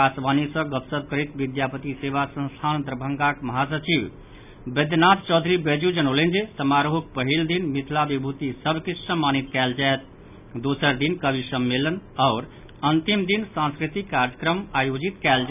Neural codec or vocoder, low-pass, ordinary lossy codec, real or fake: none; 3.6 kHz; none; real